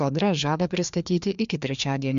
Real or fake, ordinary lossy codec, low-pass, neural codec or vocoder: fake; MP3, 64 kbps; 7.2 kHz; codec, 16 kHz, 2 kbps, FreqCodec, larger model